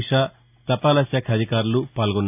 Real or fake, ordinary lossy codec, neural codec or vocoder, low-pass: real; AAC, 32 kbps; none; 3.6 kHz